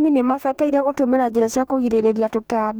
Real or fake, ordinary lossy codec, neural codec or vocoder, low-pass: fake; none; codec, 44.1 kHz, 2.6 kbps, DAC; none